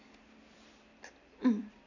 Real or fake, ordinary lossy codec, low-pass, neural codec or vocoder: real; none; 7.2 kHz; none